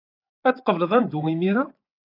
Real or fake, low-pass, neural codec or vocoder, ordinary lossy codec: fake; 5.4 kHz; vocoder, 24 kHz, 100 mel bands, Vocos; AAC, 32 kbps